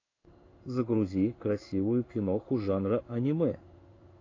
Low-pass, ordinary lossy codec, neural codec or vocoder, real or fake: 7.2 kHz; AAC, 32 kbps; codec, 16 kHz in and 24 kHz out, 1 kbps, XY-Tokenizer; fake